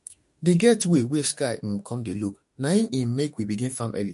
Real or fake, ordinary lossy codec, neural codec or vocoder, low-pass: fake; MP3, 48 kbps; autoencoder, 48 kHz, 32 numbers a frame, DAC-VAE, trained on Japanese speech; 14.4 kHz